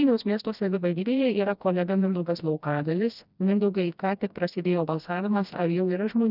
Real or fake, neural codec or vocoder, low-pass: fake; codec, 16 kHz, 1 kbps, FreqCodec, smaller model; 5.4 kHz